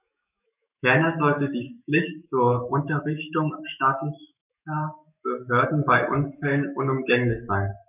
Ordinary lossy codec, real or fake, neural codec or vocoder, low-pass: none; fake; autoencoder, 48 kHz, 128 numbers a frame, DAC-VAE, trained on Japanese speech; 3.6 kHz